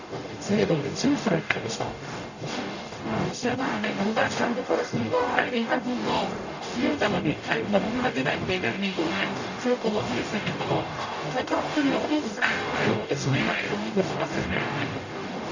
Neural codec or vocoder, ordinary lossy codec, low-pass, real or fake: codec, 44.1 kHz, 0.9 kbps, DAC; none; 7.2 kHz; fake